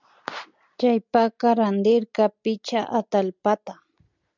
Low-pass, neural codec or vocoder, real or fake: 7.2 kHz; none; real